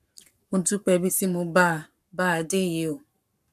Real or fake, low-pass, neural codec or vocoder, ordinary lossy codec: fake; 14.4 kHz; codec, 44.1 kHz, 7.8 kbps, Pupu-Codec; none